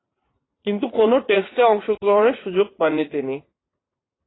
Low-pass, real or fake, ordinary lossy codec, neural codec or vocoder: 7.2 kHz; fake; AAC, 16 kbps; vocoder, 44.1 kHz, 80 mel bands, Vocos